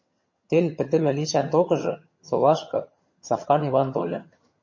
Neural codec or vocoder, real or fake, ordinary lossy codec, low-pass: vocoder, 22.05 kHz, 80 mel bands, HiFi-GAN; fake; MP3, 32 kbps; 7.2 kHz